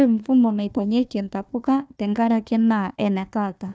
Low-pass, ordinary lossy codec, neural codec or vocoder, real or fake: none; none; codec, 16 kHz, 1 kbps, FunCodec, trained on Chinese and English, 50 frames a second; fake